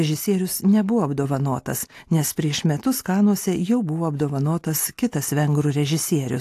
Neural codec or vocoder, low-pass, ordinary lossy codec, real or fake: none; 14.4 kHz; AAC, 64 kbps; real